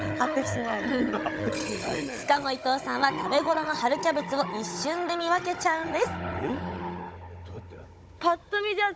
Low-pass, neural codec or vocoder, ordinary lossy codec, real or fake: none; codec, 16 kHz, 16 kbps, FunCodec, trained on Chinese and English, 50 frames a second; none; fake